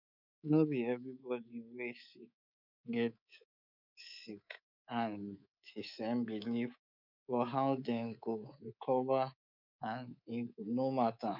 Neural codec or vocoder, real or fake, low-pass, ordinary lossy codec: codec, 24 kHz, 3.1 kbps, DualCodec; fake; 5.4 kHz; none